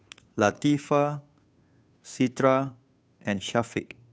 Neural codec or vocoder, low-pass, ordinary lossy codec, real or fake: codec, 16 kHz, 2 kbps, FunCodec, trained on Chinese and English, 25 frames a second; none; none; fake